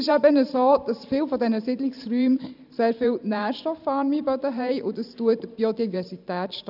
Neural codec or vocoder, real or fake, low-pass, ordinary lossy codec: vocoder, 44.1 kHz, 128 mel bands every 512 samples, BigVGAN v2; fake; 5.4 kHz; none